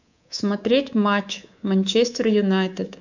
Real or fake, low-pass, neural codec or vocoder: fake; 7.2 kHz; codec, 24 kHz, 3.1 kbps, DualCodec